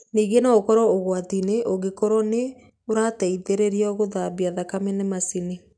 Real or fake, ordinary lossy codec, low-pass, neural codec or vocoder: real; none; 9.9 kHz; none